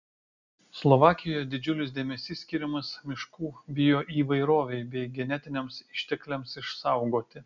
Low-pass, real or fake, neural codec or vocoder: 7.2 kHz; real; none